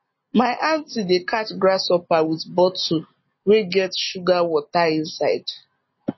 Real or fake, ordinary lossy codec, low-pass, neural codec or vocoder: real; MP3, 24 kbps; 7.2 kHz; none